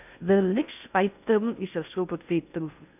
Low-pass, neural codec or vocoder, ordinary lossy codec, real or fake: 3.6 kHz; codec, 16 kHz in and 24 kHz out, 0.6 kbps, FocalCodec, streaming, 4096 codes; none; fake